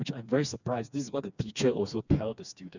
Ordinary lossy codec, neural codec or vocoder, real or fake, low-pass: none; codec, 16 kHz, 2 kbps, FreqCodec, smaller model; fake; 7.2 kHz